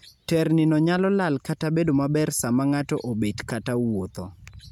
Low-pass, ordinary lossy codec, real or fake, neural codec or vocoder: 19.8 kHz; none; real; none